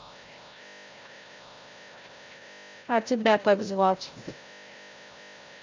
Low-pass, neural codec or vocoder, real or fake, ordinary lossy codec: 7.2 kHz; codec, 16 kHz, 0.5 kbps, FreqCodec, larger model; fake; MP3, 48 kbps